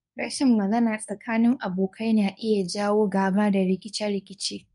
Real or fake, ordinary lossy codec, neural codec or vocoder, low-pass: fake; none; codec, 24 kHz, 0.9 kbps, WavTokenizer, medium speech release version 1; 10.8 kHz